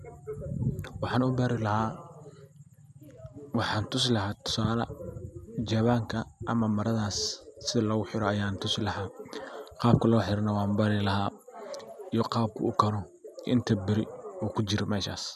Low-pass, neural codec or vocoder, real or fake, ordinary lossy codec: 14.4 kHz; none; real; none